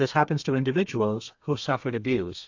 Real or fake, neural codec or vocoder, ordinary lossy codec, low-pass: fake; codec, 32 kHz, 1.9 kbps, SNAC; AAC, 48 kbps; 7.2 kHz